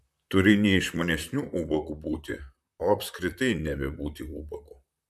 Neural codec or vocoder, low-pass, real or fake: vocoder, 44.1 kHz, 128 mel bands, Pupu-Vocoder; 14.4 kHz; fake